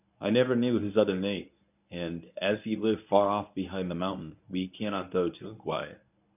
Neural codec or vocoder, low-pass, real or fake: codec, 24 kHz, 0.9 kbps, WavTokenizer, medium speech release version 1; 3.6 kHz; fake